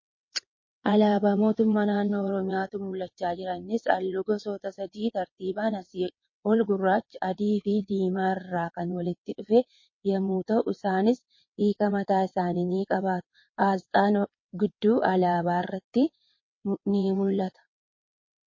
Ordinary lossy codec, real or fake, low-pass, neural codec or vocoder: MP3, 32 kbps; fake; 7.2 kHz; vocoder, 22.05 kHz, 80 mel bands, WaveNeXt